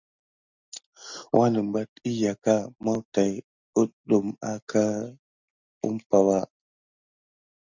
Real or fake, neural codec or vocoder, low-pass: real; none; 7.2 kHz